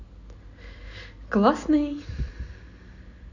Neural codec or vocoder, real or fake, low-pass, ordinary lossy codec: none; real; 7.2 kHz; none